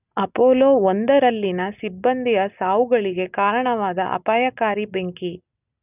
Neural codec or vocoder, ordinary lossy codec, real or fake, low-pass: none; none; real; 3.6 kHz